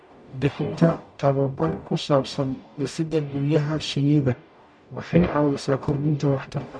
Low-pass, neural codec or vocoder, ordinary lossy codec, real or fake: 9.9 kHz; codec, 44.1 kHz, 0.9 kbps, DAC; MP3, 64 kbps; fake